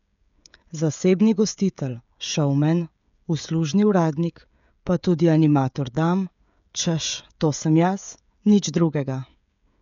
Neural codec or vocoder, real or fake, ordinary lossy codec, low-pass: codec, 16 kHz, 16 kbps, FreqCodec, smaller model; fake; none; 7.2 kHz